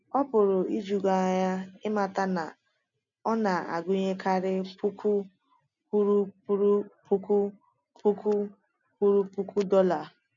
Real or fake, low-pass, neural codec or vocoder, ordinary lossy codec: real; 7.2 kHz; none; none